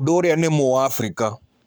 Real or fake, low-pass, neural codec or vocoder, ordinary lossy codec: fake; none; codec, 44.1 kHz, 7.8 kbps, Pupu-Codec; none